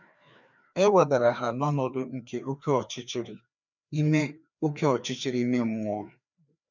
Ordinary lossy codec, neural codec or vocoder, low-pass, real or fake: none; codec, 16 kHz, 2 kbps, FreqCodec, larger model; 7.2 kHz; fake